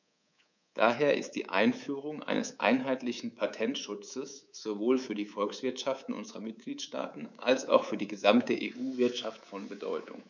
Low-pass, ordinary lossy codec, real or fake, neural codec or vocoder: 7.2 kHz; none; fake; codec, 24 kHz, 3.1 kbps, DualCodec